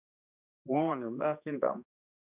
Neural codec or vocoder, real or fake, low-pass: codec, 16 kHz, 2 kbps, X-Codec, HuBERT features, trained on general audio; fake; 3.6 kHz